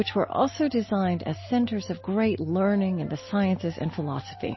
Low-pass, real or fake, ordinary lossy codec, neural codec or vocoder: 7.2 kHz; real; MP3, 24 kbps; none